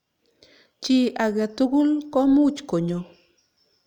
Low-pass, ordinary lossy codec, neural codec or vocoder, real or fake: 19.8 kHz; none; none; real